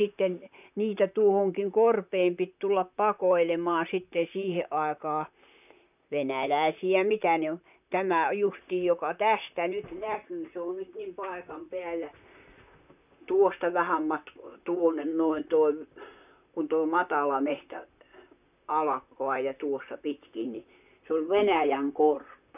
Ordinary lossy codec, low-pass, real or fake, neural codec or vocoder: none; 3.6 kHz; fake; vocoder, 44.1 kHz, 128 mel bands, Pupu-Vocoder